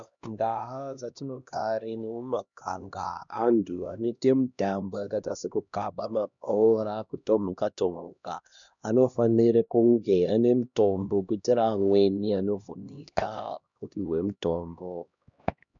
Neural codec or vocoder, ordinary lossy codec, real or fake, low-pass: codec, 16 kHz, 1 kbps, X-Codec, HuBERT features, trained on LibriSpeech; MP3, 96 kbps; fake; 7.2 kHz